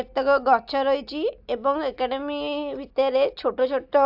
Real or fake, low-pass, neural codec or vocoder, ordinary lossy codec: real; 5.4 kHz; none; AAC, 48 kbps